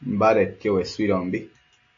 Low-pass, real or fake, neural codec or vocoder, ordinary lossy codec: 7.2 kHz; real; none; AAC, 48 kbps